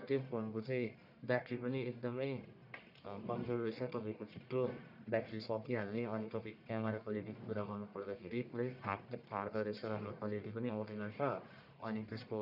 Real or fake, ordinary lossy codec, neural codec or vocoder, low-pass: fake; none; codec, 44.1 kHz, 1.7 kbps, Pupu-Codec; 5.4 kHz